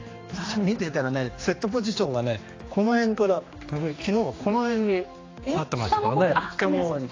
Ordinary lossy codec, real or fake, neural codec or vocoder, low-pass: AAC, 32 kbps; fake; codec, 16 kHz, 2 kbps, X-Codec, HuBERT features, trained on general audio; 7.2 kHz